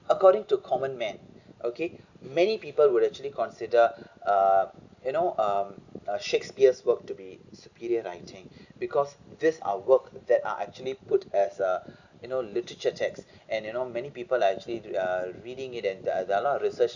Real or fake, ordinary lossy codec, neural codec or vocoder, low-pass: real; none; none; 7.2 kHz